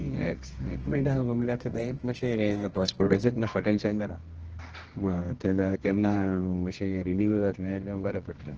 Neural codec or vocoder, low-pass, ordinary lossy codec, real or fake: codec, 24 kHz, 0.9 kbps, WavTokenizer, medium music audio release; 7.2 kHz; Opus, 16 kbps; fake